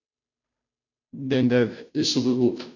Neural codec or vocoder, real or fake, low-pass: codec, 16 kHz, 0.5 kbps, FunCodec, trained on Chinese and English, 25 frames a second; fake; 7.2 kHz